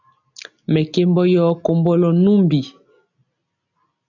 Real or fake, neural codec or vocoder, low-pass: real; none; 7.2 kHz